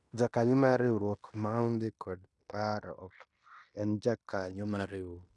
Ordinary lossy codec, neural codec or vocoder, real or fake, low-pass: none; codec, 16 kHz in and 24 kHz out, 0.9 kbps, LongCat-Audio-Codec, fine tuned four codebook decoder; fake; 10.8 kHz